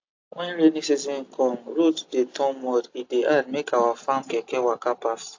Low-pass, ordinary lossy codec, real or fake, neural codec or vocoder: 7.2 kHz; AAC, 48 kbps; real; none